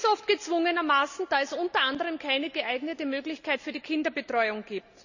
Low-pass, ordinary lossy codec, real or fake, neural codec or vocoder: 7.2 kHz; none; real; none